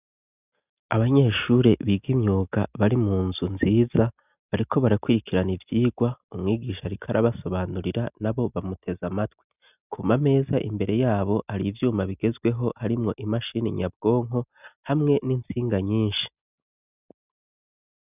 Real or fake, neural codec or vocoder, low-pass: real; none; 3.6 kHz